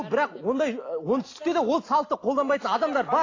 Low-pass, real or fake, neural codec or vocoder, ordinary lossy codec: 7.2 kHz; real; none; AAC, 32 kbps